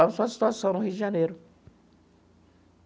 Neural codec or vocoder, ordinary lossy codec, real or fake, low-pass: none; none; real; none